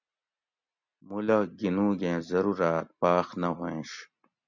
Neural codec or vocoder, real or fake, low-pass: none; real; 7.2 kHz